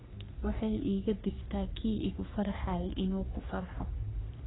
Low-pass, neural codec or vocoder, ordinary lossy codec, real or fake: 7.2 kHz; codec, 44.1 kHz, 3.4 kbps, Pupu-Codec; AAC, 16 kbps; fake